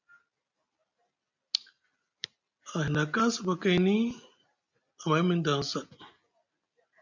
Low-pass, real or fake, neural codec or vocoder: 7.2 kHz; real; none